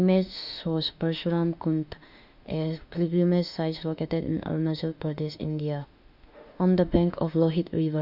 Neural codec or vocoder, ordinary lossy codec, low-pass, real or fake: autoencoder, 48 kHz, 32 numbers a frame, DAC-VAE, trained on Japanese speech; none; 5.4 kHz; fake